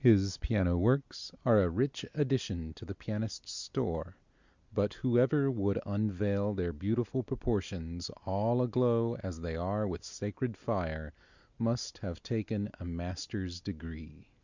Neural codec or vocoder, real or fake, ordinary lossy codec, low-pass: none; real; Opus, 64 kbps; 7.2 kHz